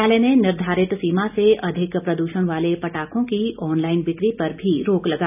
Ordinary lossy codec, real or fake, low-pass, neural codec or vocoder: none; real; 3.6 kHz; none